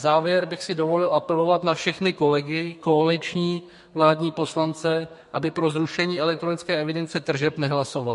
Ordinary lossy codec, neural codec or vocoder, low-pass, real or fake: MP3, 48 kbps; codec, 32 kHz, 1.9 kbps, SNAC; 14.4 kHz; fake